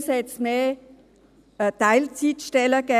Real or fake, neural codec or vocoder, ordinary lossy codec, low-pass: real; none; none; 14.4 kHz